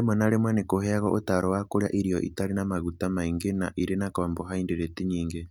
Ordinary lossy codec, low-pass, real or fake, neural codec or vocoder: none; 19.8 kHz; real; none